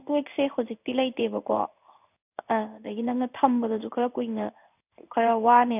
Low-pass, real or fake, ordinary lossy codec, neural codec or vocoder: 3.6 kHz; fake; none; codec, 16 kHz in and 24 kHz out, 1 kbps, XY-Tokenizer